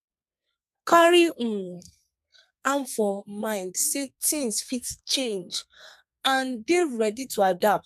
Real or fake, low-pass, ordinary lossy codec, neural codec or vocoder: fake; 14.4 kHz; none; codec, 32 kHz, 1.9 kbps, SNAC